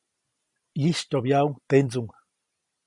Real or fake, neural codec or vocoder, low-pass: real; none; 10.8 kHz